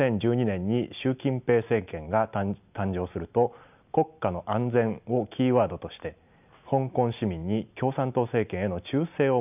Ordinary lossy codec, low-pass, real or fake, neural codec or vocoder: none; 3.6 kHz; real; none